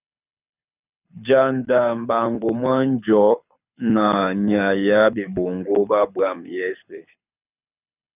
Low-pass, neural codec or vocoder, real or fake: 3.6 kHz; codec, 24 kHz, 6 kbps, HILCodec; fake